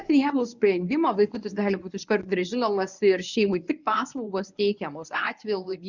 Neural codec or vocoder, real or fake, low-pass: codec, 24 kHz, 0.9 kbps, WavTokenizer, medium speech release version 1; fake; 7.2 kHz